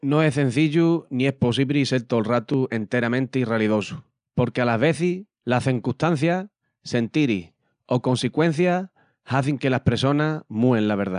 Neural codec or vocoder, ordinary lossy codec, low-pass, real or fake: none; none; 9.9 kHz; real